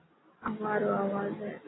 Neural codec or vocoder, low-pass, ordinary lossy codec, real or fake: none; 7.2 kHz; AAC, 16 kbps; real